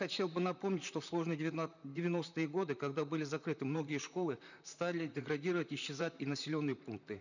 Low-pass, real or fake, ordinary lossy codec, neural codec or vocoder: 7.2 kHz; fake; none; vocoder, 44.1 kHz, 128 mel bands, Pupu-Vocoder